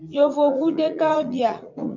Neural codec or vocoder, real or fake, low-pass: vocoder, 44.1 kHz, 80 mel bands, Vocos; fake; 7.2 kHz